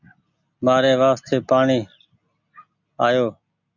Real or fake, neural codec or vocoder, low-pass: real; none; 7.2 kHz